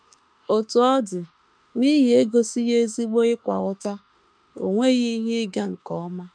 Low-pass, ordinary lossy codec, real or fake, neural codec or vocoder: 9.9 kHz; none; fake; autoencoder, 48 kHz, 32 numbers a frame, DAC-VAE, trained on Japanese speech